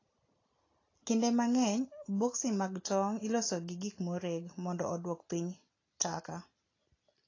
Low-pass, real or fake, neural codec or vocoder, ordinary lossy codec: 7.2 kHz; real; none; AAC, 32 kbps